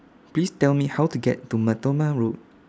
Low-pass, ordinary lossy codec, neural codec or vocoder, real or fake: none; none; none; real